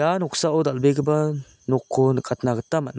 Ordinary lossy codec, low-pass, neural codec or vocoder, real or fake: none; none; none; real